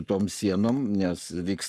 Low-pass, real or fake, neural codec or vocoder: 14.4 kHz; fake; codec, 44.1 kHz, 7.8 kbps, Pupu-Codec